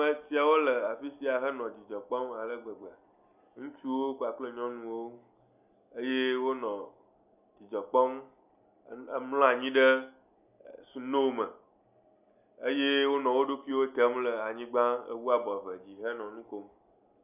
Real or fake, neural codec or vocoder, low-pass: real; none; 3.6 kHz